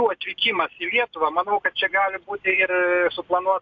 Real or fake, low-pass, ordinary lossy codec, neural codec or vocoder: real; 7.2 kHz; AAC, 48 kbps; none